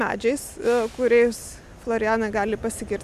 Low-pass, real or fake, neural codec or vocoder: 14.4 kHz; real; none